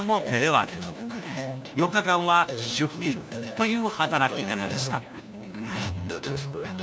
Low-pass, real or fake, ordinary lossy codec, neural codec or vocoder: none; fake; none; codec, 16 kHz, 1 kbps, FunCodec, trained on LibriTTS, 50 frames a second